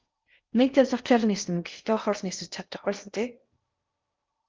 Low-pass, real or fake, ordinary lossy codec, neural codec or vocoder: 7.2 kHz; fake; Opus, 24 kbps; codec, 16 kHz in and 24 kHz out, 0.6 kbps, FocalCodec, streaming, 4096 codes